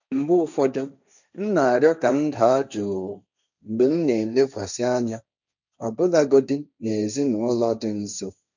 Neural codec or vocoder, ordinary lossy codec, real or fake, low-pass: codec, 16 kHz, 1.1 kbps, Voila-Tokenizer; none; fake; 7.2 kHz